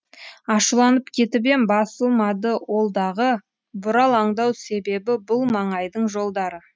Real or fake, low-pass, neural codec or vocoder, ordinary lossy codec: real; none; none; none